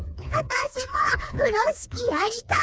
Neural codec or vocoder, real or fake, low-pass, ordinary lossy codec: codec, 16 kHz, 2 kbps, FreqCodec, smaller model; fake; none; none